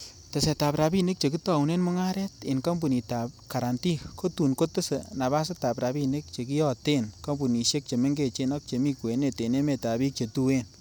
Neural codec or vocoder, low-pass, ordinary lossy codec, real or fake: none; none; none; real